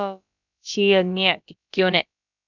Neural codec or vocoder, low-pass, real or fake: codec, 16 kHz, about 1 kbps, DyCAST, with the encoder's durations; 7.2 kHz; fake